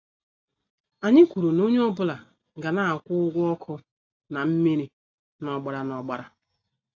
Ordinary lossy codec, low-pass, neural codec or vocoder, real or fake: AAC, 48 kbps; 7.2 kHz; none; real